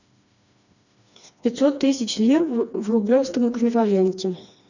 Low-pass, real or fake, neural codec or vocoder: 7.2 kHz; fake; codec, 16 kHz, 2 kbps, FreqCodec, smaller model